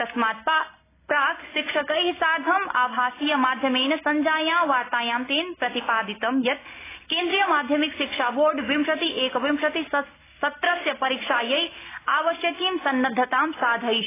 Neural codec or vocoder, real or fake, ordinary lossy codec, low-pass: none; real; AAC, 16 kbps; 3.6 kHz